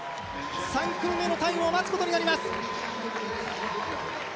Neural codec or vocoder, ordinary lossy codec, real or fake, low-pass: none; none; real; none